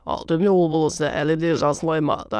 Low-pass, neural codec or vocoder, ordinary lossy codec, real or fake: none; autoencoder, 22.05 kHz, a latent of 192 numbers a frame, VITS, trained on many speakers; none; fake